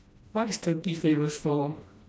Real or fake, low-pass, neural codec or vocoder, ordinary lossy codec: fake; none; codec, 16 kHz, 1 kbps, FreqCodec, smaller model; none